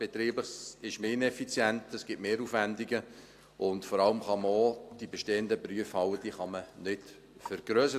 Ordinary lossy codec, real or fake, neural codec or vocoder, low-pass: AAC, 64 kbps; real; none; 14.4 kHz